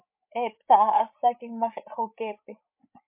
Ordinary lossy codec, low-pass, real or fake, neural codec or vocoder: MP3, 32 kbps; 3.6 kHz; fake; codec, 16 kHz, 8 kbps, FreqCodec, larger model